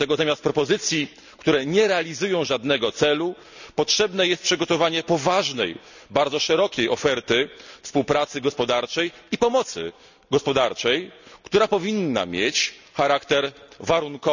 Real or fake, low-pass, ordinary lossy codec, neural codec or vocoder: real; 7.2 kHz; none; none